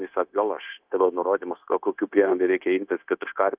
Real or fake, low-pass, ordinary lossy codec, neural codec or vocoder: fake; 3.6 kHz; Opus, 32 kbps; codec, 16 kHz, 0.9 kbps, LongCat-Audio-Codec